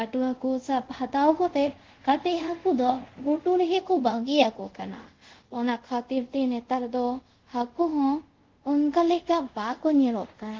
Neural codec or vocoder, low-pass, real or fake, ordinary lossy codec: codec, 24 kHz, 0.5 kbps, DualCodec; 7.2 kHz; fake; Opus, 16 kbps